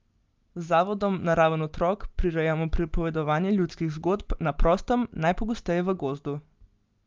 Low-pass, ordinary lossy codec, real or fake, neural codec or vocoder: 7.2 kHz; Opus, 32 kbps; real; none